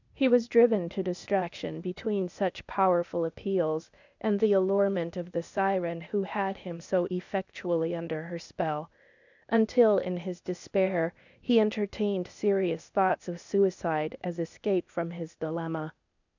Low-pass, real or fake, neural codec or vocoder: 7.2 kHz; fake; codec, 16 kHz, 0.8 kbps, ZipCodec